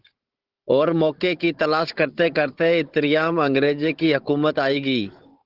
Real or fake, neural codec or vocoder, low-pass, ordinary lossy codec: fake; codec, 16 kHz, 16 kbps, FunCodec, trained on Chinese and English, 50 frames a second; 5.4 kHz; Opus, 16 kbps